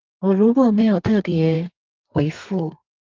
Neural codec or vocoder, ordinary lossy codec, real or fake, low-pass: codec, 32 kHz, 1.9 kbps, SNAC; Opus, 32 kbps; fake; 7.2 kHz